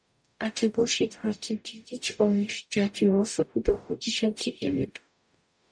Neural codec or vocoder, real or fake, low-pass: codec, 44.1 kHz, 0.9 kbps, DAC; fake; 9.9 kHz